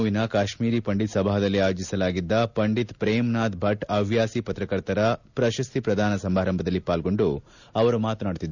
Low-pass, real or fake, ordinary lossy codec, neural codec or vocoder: 7.2 kHz; real; none; none